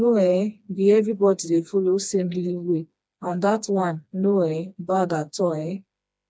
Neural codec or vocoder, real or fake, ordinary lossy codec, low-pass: codec, 16 kHz, 2 kbps, FreqCodec, smaller model; fake; none; none